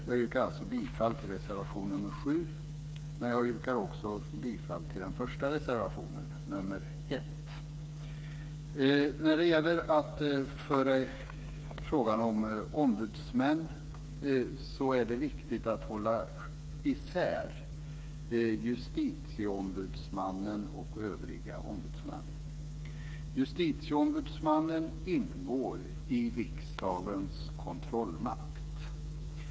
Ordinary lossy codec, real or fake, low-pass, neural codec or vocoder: none; fake; none; codec, 16 kHz, 4 kbps, FreqCodec, smaller model